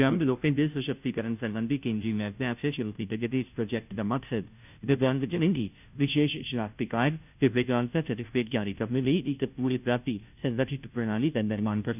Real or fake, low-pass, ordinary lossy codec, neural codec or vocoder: fake; 3.6 kHz; none; codec, 16 kHz, 0.5 kbps, FunCodec, trained on Chinese and English, 25 frames a second